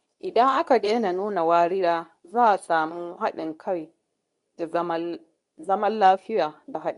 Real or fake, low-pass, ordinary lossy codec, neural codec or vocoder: fake; 10.8 kHz; none; codec, 24 kHz, 0.9 kbps, WavTokenizer, medium speech release version 2